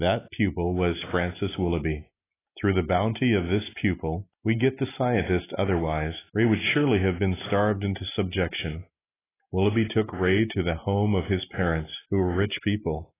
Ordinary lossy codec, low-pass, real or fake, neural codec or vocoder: AAC, 16 kbps; 3.6 kHz; real; none